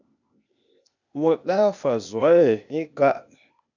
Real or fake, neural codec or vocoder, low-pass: fake; codec, 16 kHz, 0.8 kbps, ZipCodec; 7.2 kHz